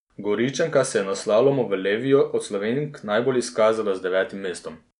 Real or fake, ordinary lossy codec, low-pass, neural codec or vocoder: real; none; 10.8 kHz; none